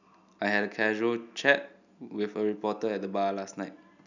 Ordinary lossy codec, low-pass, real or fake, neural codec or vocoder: none; 7.2 kHz; real; none